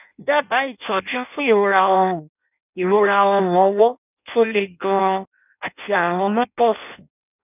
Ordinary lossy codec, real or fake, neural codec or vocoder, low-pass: none; fake; codec, 16 kHz in and 24 kHz out, 0.6 kbps, FireRedTTS-2 codec; 3.6 kHz